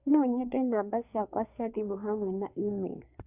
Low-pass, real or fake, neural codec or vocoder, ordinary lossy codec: 3.6 kHz; fake; codec, 24 kHz, 1 kbps, SNAC; none